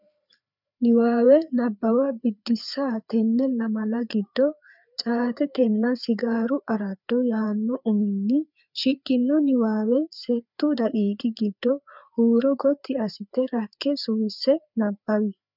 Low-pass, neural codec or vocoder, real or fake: 5.4 kHz; codec, 16 kHz, 4 kbps, FreqCodec, larger model; fake